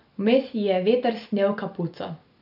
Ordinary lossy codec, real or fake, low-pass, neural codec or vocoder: none; real; 5.4 kHz; none